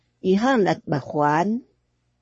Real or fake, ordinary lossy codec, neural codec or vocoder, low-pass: fake; MP3, 32 kbps; codec, 44.1 kHz, 3.4 kbps, Pupu-Codec; 10.8 kHz